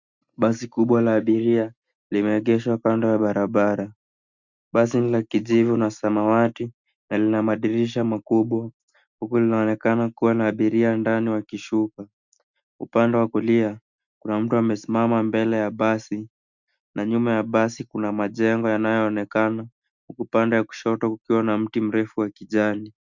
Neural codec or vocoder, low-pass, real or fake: none; 7.2 kHz; real